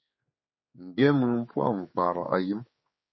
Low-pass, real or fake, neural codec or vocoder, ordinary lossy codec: 7.2 kHz; fake; codec, 16 kHz, 4 kbps, X-Codec, HuBERT features, trained on general audio; MP3, 24 kbps